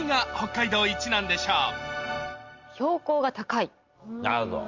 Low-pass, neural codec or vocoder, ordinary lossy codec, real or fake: 7.2 kHz; none; Opus, 32 kbps; real